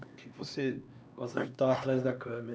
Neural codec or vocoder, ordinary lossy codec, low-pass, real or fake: codec, 16 kHz, 2 kbps, X-Codec, HuBERT features, trained on LibriSpeech; none; none; fake